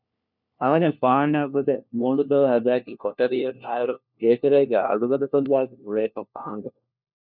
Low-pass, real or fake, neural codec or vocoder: 5.4 kHz; fake; codec, 16 kHz, 1 kbps, FunCodec, trained on LibriTTS, 50 frames a second